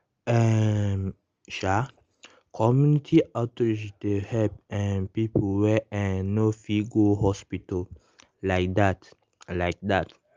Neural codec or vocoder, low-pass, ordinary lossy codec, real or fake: none; 7.2 kHz; Opus, 24 kbps; real